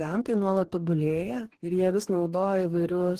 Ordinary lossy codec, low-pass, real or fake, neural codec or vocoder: Opus, 16 kbps; 14.4 kHz; fake; codec, 44.1 kHz, 2.6 kbps, DAC